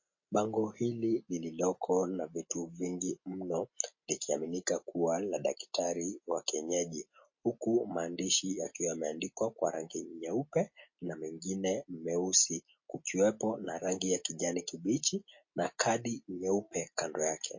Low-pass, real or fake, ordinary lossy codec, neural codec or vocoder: 7.2 kHz; real; MP3, 32 kbps; none